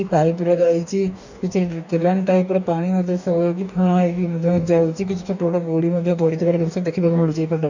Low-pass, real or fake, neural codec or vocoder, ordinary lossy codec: 7.2 kHz; fake; codec, 44.1 kHz, 2.6 kbps, DAC; none